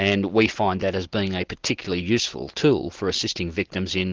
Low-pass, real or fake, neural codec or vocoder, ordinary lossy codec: 7.2 kHz; real; none; Opus, 16 kbps